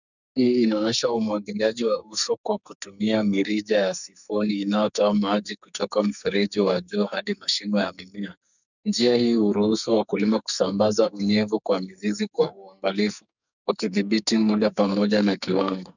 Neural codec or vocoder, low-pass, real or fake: codec, 44.1 kHz, 2.6 kbps, SNAC; 7.2 kHz; fake